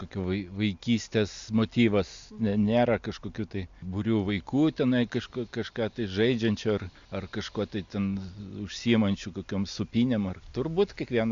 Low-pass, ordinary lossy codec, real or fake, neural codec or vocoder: 7.2 kHz; MP3, 64 kbps; real; none